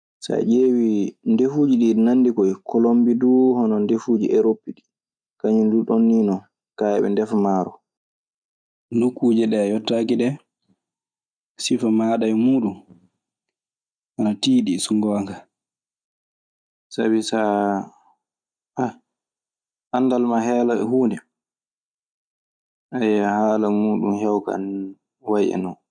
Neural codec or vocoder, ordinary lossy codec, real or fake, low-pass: none; none; real; 9.9 kHz